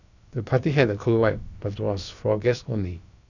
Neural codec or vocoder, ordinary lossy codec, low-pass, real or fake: codec, 16 kHz, about 1 kbps, DyCAST, with the encoder's durations; none; 7.2 kHz; fake